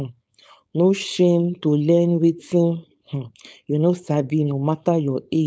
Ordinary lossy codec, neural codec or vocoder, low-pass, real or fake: none; codec, 16 kHz, 4.8 kbps, FACodec; none; fake